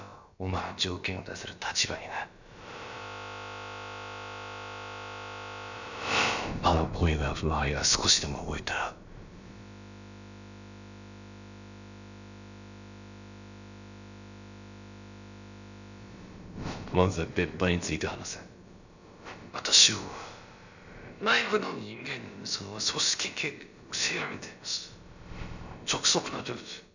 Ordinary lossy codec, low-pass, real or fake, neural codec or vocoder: none; 7.2 kHz; fake; codec, 16 kHz, about 1 kbps, DyCAST, with the encoder's durations